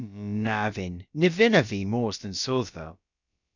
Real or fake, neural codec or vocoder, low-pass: fake; codec, 16 kHz, about 1 kbps, DyCAST, with the encoder's durations; 7.2 kHz